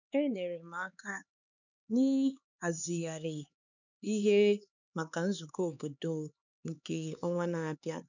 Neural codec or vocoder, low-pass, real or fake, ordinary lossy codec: codec, 16 kHz, 4 kbps, X-Codec, HuBERT features, trained on LibriSpeech; 7.2 kHz; fake; none